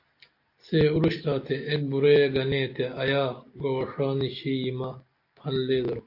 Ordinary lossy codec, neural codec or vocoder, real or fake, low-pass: AAC, 32 kbps; none; real; 5.4 kHz